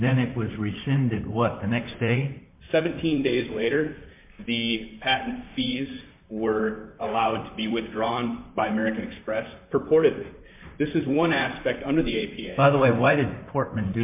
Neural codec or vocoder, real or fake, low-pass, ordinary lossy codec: vocoder, 44.1 kHz, 128 mel bands, Pupu-Vocoder; fake; 3.6 kHz; MP3, 32 kbps